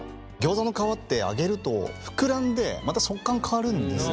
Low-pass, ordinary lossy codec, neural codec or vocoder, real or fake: none; none; none; real